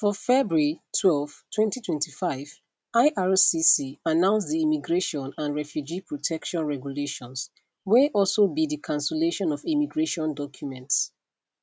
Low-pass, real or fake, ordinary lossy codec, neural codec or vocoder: none; real; none; none